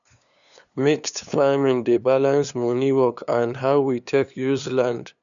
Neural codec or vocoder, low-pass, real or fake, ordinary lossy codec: codec, 16 kHz, 2 kbps, FunCodec, trained on LibriTTS, 25 frames a second; 7.2 kHz; fake; none